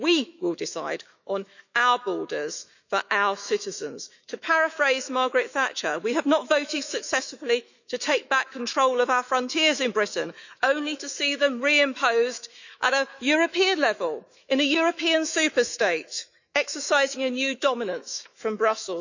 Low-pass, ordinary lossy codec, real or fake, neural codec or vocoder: 7.2 kHz; none; fake; autoencoder, 48 kHz, 128 numbers a frame, DAC-VAE, trained on Japanese speech